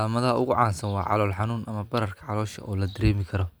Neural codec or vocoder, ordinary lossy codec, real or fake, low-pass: none; none; real; none